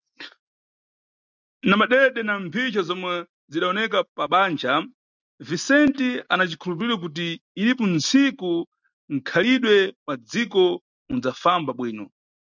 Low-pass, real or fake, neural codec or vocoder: 7.2 kHz; real; none